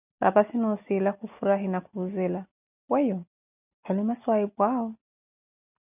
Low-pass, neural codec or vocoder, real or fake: 3.6 kHz; none; real